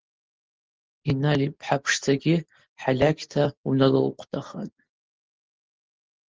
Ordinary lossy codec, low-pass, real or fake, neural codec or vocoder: Opus, 16 kbps; 7.2 kHz; real; none